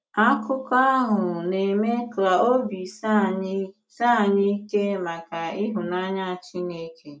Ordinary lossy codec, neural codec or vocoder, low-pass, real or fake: none; none; none; real